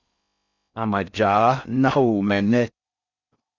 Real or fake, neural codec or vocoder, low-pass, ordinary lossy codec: fake; codec, 16 kHz in and 24 kHz out, 0.6 kbps, FocalCodec, streaming, 4096 codes; 7.2 kHz; Opus, 64 kbps